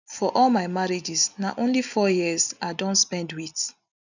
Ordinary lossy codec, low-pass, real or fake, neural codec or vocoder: none; 7.2 kHz; real; none